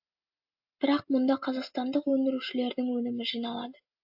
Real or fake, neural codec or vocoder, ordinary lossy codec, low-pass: real; none; AAC, 48 kbps; 5.4 kHz